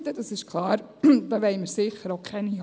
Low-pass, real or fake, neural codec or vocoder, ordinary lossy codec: none; real; none; none